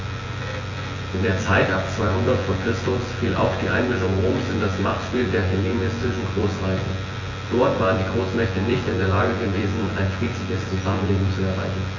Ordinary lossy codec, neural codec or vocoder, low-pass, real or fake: AAC, 32 kbps; vocoder, 24 kHz, 100 mel bands, Vocos; 7.2 kHz; fake